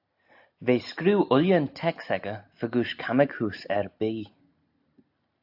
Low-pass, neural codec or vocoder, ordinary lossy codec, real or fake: 5.4 kHz; none; AAC, 48 kbps; real